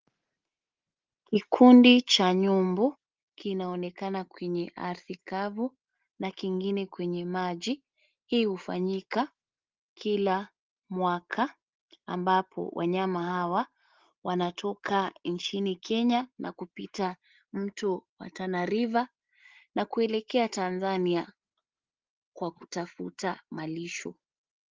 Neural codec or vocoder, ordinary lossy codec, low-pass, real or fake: none; Opus, 16 kbps; 7.2 kHz; real